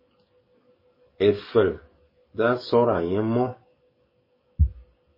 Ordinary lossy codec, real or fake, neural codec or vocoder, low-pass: MP3, 24 kbps; fake; codec, 44.1 kHz, 7.8 kbps, DAC; 5.4 kHz